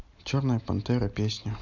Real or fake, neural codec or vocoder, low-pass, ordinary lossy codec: real; none; 7.2 kHz; none